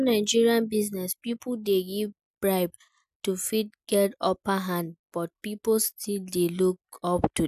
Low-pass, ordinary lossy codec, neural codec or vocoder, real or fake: 14.4 kHz; none; none; real